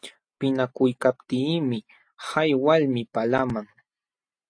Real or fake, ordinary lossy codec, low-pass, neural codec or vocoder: real; AAC, 48 kbps; 9.9 kHz; none